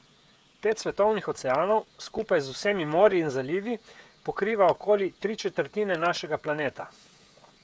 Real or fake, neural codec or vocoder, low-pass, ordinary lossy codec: fake; codec, 16 kHz, 16 kbps, FreqCodec, smaller model; none; none